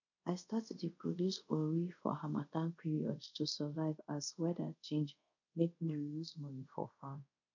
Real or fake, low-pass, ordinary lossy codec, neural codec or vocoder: fake; 7.2 kHz; none; codec, 24 kHz, 0.5 kbps, DualCodec